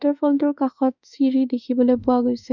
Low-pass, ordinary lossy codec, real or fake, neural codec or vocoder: 7.2 kHz; none; fake; autoencoder, 48 kHz, 32 numbers a frame, DAC-VAE, trained on Japanese speech